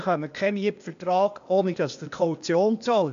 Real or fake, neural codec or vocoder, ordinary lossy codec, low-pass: fake; codec, 16 kHz, 0.8 kbps, ZipCodec; AAC, 64 kbps; 7.2 kHz